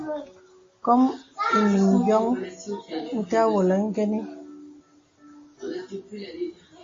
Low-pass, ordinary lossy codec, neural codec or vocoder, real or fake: 7.2 kHz; AAC, 32 kbps; none; real